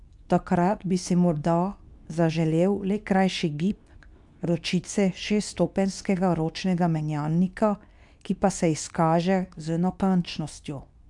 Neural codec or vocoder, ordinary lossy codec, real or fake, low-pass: codec, 24 kHz, 0.9 kbps, WavTokenizer, medium speech release version 2; none; fake; 10.8 kHz